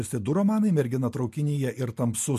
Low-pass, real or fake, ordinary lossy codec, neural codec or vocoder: 14.4 kHz; real; MP3, 64 kbps; none